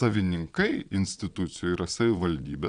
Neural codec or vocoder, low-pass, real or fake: vocoder, 22.05 kHz, 80 mel bands, Vocos; 9.9 kHz; fake